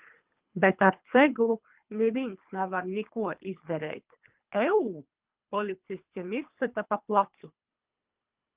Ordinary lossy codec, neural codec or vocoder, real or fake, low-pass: Opus, 32 kbps; codec, 24 kHz, 3 kbps, HILCodec; fake; 3.6 kHz